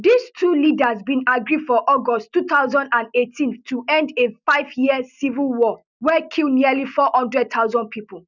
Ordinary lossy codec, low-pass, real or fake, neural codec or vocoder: none; 7.2 kHz; real; none